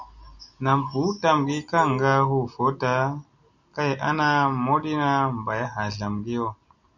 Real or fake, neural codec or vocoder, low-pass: real; none; 7.2 kHz